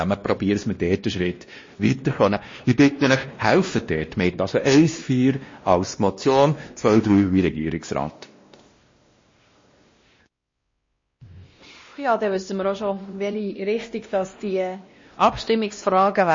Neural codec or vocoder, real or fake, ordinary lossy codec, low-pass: codec, 16 kHz, 1 kbps, X-Codec, WavLM features, trained on Multilingual LibriSpeech; fake; MP3, 32 kbps; 7.2 kHz